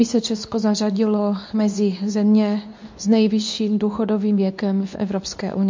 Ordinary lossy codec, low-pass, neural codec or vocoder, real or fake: MP3, 48 kbps; 7.2 kHz; codec, 24 kHz, 0.9 kbps, WavTokenizer, medium speech release version 2; fake